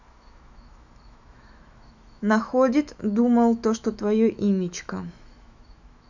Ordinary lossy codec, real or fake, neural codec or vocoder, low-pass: none; fake; autoencoder, 48 kHz, 128 numbers a frame, DAC-VAE, trained on Japanese speech; 7.2 kHz